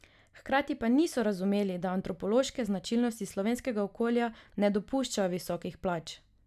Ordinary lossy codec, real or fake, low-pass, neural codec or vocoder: none; real; 14.4 kHz; none